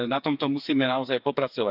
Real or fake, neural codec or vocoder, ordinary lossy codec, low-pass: fake; codec, 16 kHz, 4 kbps, FreqCodec, smaller model; none; 5.4 kHz